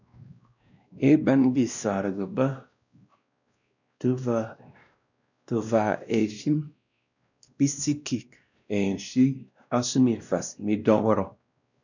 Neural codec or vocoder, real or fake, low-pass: codec, 16 kHz, 1 kbps, X-Codec, WavLM features, trained on Multilingual LibriSpeech; fake; 7.2 kHz